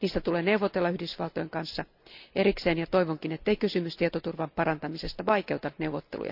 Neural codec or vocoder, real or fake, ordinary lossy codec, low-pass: none; real; none; 5.4 kHz